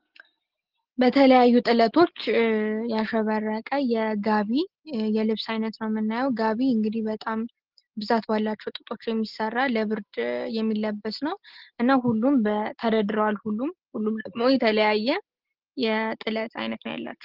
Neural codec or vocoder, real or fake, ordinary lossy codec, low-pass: none; real; Opus, 16 kbps; 5.4 kHz